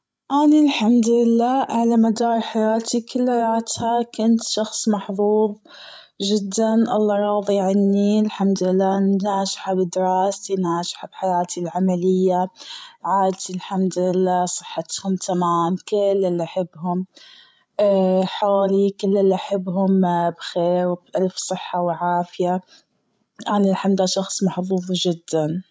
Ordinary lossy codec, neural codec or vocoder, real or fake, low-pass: none; codec, 16 kHz, 16 kbps, FreqCodec, larger model; fake; none